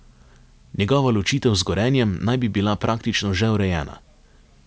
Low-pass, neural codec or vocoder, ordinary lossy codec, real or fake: none; none; none; real